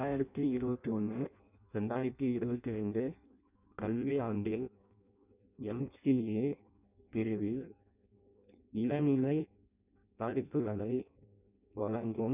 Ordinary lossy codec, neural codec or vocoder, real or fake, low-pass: none; codec, 16 kHz in and 24 kHz out, 0.6 kbps, FireRedTTS-2 codec; fake; 3.6 kHz